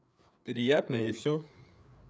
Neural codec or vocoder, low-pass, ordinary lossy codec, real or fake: codec, 16 kHz, 4 kbps, FreqCodec, larger model; none; none; fake